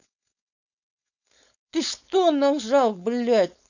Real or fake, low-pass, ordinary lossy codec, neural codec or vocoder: fake; 7.2 kHz; none; codec, 16 kHz, 4.8 kbps, FACodec